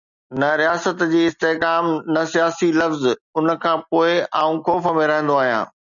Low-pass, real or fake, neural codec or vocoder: 7.2 kHz; real; none